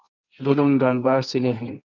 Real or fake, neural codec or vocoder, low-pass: fake; codec, 24 kHz, 0.9 kbps, WavTokenizer, medium music audio release; 7.2 kHz